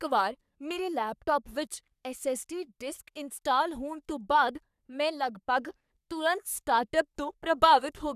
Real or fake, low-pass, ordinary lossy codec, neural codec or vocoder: fake; 14.4 kHz; none; codec, 44.1 kHz, 3.4 kbps, Pupu-Codec